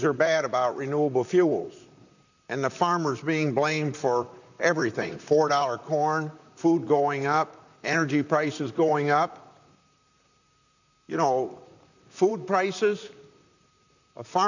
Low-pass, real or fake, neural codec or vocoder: 7.2 kHz; fake; vocoder, 44.1 kHz, 128 mel bands, Pupu-Vocoder